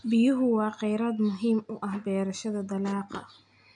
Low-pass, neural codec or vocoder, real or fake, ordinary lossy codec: 9.9 kHz; none; real; none